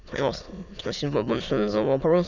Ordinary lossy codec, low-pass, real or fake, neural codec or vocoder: none; 7.2 kHz; fake; autoencoder, 22.05 kHz, a latent of 192 numbers a frame, VITS, trained on many speakers